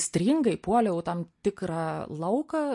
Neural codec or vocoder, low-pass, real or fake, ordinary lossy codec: none; 10.8 kHz; real; MP3, 48 kbps